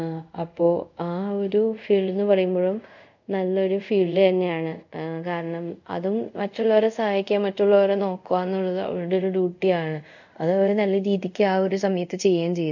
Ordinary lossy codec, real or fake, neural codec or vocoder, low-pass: none; fake; codec, 24 kHz, 0.5 kbps, DualCodec; 7.2 kHz